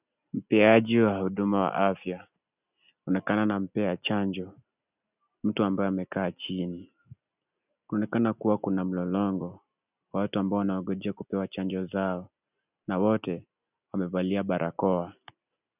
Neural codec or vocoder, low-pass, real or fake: none; 3.6 kHz; real